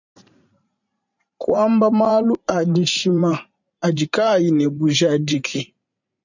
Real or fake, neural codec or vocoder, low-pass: fake; vocoder, 44.1 kHz, 128 mel bands every 512 samples, BigVGAN v2; 7.2 kHz